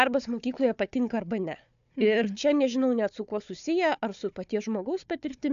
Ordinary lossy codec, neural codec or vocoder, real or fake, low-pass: AAC, 96 kbps; codec, 16 kHz, 4 kbps, FunCodec, trained on Chinese and English, 50 frames a second; fake; 7.2 kHz